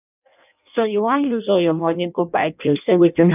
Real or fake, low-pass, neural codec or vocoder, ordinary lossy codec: fake; 3.6 kHz; codec, 16 kHz in and 24 kHz out, 0.6 kbps, FireRedTTS-2 codec; none